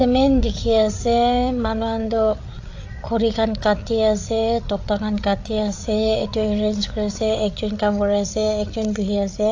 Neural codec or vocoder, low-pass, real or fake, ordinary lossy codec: codec, 16 kHz, 8 kbps, FreqCodec, larger model; 7.2 kHz; fake; AAC, 48 kbps